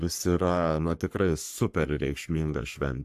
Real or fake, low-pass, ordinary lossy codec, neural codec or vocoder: fake; 14.4 kHz; AAC, 96 kbps; codec, 44.1 kHz, 3.4 kbps, Pupu-Codec